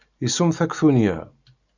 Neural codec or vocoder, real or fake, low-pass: none; real; 7.2 kHz